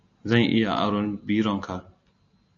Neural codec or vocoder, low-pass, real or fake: none; 7.2 kHz; real